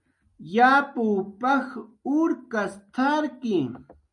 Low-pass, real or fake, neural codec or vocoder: 10.8 kHz; real; none